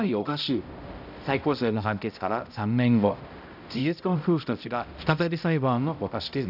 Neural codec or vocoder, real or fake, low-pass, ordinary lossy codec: codec, 16 kHz, 0.5 kbps, X-Codec, HuBERT features, trained on balanced general audio; fake; 5.4 kHz; none